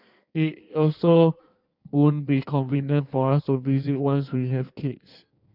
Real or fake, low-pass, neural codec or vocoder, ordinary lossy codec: fake; 5.4 kHz; codec, 16 kHz in and 24 kHz out, 1.1 kbps, FireRedTTS-2 codec; none